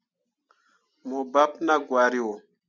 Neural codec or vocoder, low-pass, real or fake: none; 7.2 kHz; real